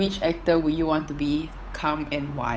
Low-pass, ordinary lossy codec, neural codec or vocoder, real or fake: none; none; codec, 16 kHz, 8 kbps, FunCodec, trained on Chinese and English, 25 frames a second; fake